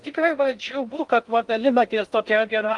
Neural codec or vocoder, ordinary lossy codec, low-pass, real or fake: codec, 16 kHz in and 24 kHz out, 0.6 kbps, FocalCodec, streaming, 4096 codes; Opus, 24 kbps; 10.8 kHz; fake